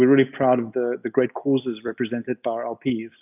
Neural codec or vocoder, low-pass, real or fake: none; 3.6 kHz; real